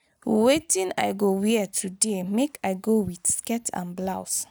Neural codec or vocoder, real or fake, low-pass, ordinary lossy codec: none; real; none; none